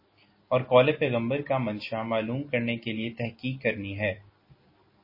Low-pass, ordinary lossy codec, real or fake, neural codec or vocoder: 5.4 kHz; MP3, 24 kbps; real; none